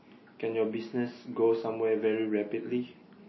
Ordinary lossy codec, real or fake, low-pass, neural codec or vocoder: MP3, 24 kbps; real; 7.2 kHz; none